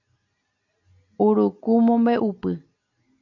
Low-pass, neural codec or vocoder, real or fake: 7.2 kHz; none; real